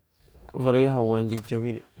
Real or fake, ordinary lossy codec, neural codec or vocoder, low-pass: fake; none; codec, 44.1 kHz, 2.6 kbps, DAC; none